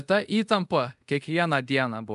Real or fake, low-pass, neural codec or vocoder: fake; 10.8 kHz; codec, 24 kHz, 0.5 kbps, DualCodec